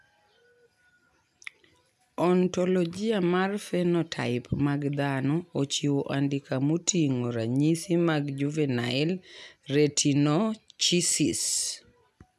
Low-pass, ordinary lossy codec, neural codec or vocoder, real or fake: 14.4 kHz; none; none; real